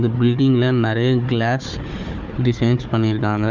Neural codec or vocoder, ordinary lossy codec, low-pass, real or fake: codec, 16 kHz, 4 kbps, FunCodec, trained on Chinese and English, 50 frames a second; Opus, 32 kbps; 7.2 kHz; fake